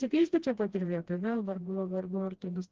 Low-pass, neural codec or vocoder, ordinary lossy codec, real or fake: 7.2 kHz; codec, 16 kHz, 1 kbps, FreqCodec, smaller model; Opus, 16 kbps; fake